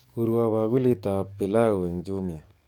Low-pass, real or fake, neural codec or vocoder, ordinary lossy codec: 19.8 kHz; fake; codec, 44.1 kHz, 7.8 kbps, DAC; none